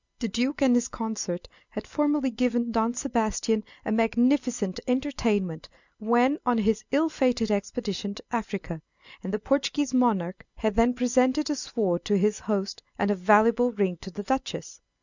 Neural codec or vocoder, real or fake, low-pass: none; real; 7.2 kHz